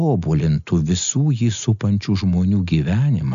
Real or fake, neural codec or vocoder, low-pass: real; none; 7.2 kHz